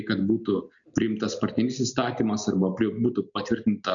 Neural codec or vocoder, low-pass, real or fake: none; 7.2 kHz; real